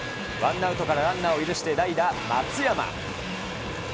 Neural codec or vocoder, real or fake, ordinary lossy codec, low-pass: none; real; none; none